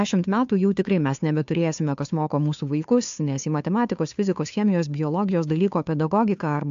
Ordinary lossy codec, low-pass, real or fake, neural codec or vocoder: AAC, 64 kbps; 7.2 kHz; fake; codec, 16 kHz, 2 kbps, FunCodec, trained on Chinese and English, 25 frames a second